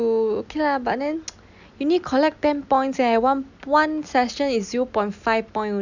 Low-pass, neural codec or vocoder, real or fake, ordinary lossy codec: 7.2 kHz; none; real; none